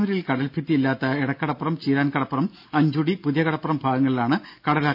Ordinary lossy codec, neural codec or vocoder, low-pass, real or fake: none; none; 5.4 kHz; real